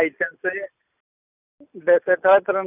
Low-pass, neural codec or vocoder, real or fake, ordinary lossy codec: 3.6 kHz; none; real; none